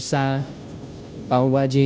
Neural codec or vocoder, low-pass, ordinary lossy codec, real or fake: codec, 16 kHz, 0.5 kbps, FunCodec, trained on Chinese and English, 25 frames a second; none; none; fake